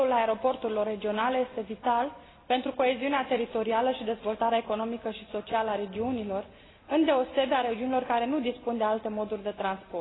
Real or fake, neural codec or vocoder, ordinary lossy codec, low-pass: real; none; AAC, 16 kbps; 7.2 kHz